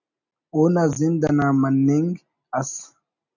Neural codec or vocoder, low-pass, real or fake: none; 7.2 kHz; real